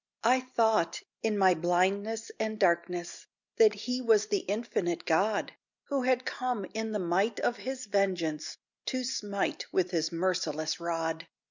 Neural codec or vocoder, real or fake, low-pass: none; real; 7.2 kHz